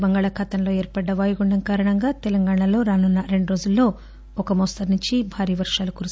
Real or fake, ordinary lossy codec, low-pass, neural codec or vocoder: real; none; none; none